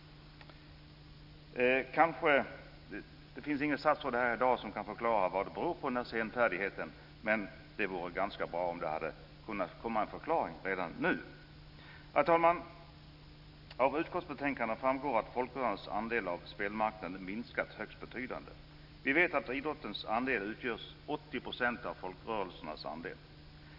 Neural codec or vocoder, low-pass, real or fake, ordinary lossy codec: none; 5.4 kHz; real; none